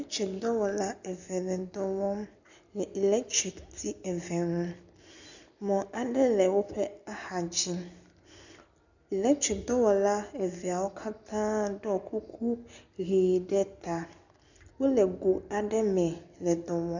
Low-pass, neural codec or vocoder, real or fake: 7.2 kHz; none; real